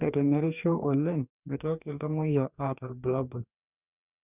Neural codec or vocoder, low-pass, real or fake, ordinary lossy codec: codec, 44.1 kHz, 2.6 kbps, DAC; 3.6 kHz; fake; none